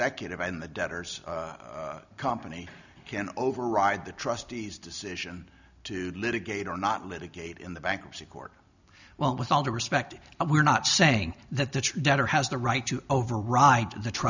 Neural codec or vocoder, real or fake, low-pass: none; real; 7.2 kHz